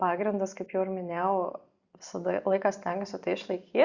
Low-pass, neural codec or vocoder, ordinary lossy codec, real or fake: 7.2 kHz; vocoder, 44.1 kHz, 128 mel bands every 256 samples, BigVGAN v2; Opus, 64 kbps; fake